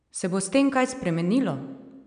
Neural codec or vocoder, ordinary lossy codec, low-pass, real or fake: none; none; 9.9 kHz; real